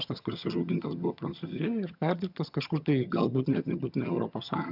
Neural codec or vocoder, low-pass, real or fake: vocoder, 22.05 kHz, 80 mel bands, HiFi-GAN; 5.4 kHz; fake